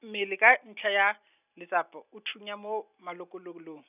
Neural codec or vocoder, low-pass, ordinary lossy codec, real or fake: none; 3.6 kHz; none; real